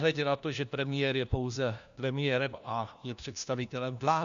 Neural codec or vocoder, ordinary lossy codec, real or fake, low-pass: codec, 16 kHz, 1 kbps, FunCodec, trained on LibriTTS, 50 frames a second; MP3, 96 kbps; fake; 7.2 kHz